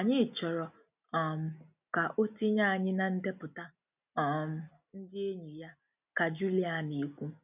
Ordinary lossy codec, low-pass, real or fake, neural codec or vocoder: none; 3.6 kHz; real; none